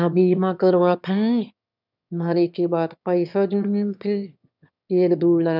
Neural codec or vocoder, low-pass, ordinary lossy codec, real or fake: autoencoder, 22.05 kHz, a latent of 192 numbers a frame, VITS, trained on one speaker; 5.4 kHz; none; fake